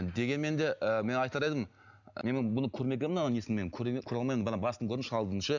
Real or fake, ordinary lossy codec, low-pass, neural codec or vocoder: real; none; 7.2 kHz; none